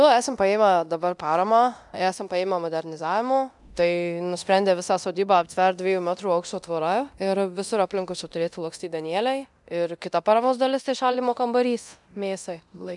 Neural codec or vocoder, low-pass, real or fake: codec, 24 kHz, 0.9 kbps, DualCodec; 10.8 kHz; fake